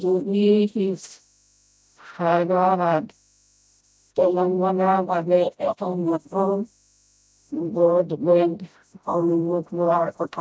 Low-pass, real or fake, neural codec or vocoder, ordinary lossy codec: none; fake; codec, 16 kHz, 0.5 kbps, FreqCodec, smaller model; none